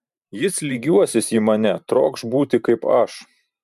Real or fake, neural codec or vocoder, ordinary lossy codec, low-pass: fake; vocoder, 44.1 kHz, 128 mel bands every 512 samples, BigVGAN v2; MP3, 96 kbps; 14.4 kHz